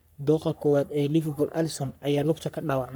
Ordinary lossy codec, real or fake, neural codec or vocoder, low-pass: none; fake; codec, 44.1 kHz, 3.4 kbps, Pupu-Codec; none